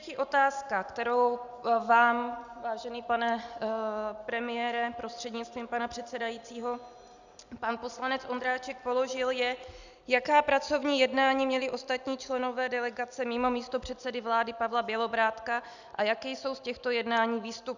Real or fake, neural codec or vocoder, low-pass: real; none; 7.2 kHz